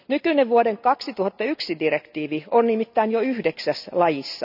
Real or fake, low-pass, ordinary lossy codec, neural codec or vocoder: real; 5.4 kHz; none; none